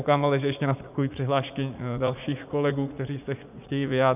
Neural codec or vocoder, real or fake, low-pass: vocoder, 44.1 kHz, 80 mel bands, Vocos; fake; 3.6 kHz